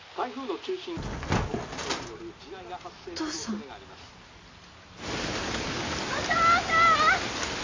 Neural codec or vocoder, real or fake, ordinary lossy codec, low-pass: none; real; AAC, 32 kbps; 7.2 kHz